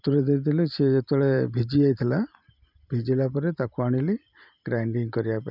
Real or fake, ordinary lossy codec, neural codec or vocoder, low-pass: fake; none; vocoder, 44.1 kHz, 128 mel bands every 512 samples, BigVGAN v2; 5.4 kHz